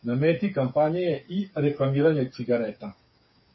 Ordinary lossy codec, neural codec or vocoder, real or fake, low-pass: MP3, 24 kbps; codec, 16 kHz, 8 kbps, FreqCodec, smaller model; fake; 7.2 kHz